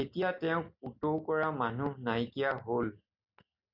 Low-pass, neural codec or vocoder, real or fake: 5.4 kHz; none; real